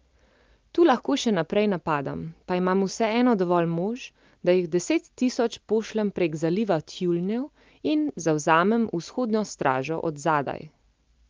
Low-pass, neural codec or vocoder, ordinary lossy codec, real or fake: 7.2 kHz; none; Opus, 24 kbps; real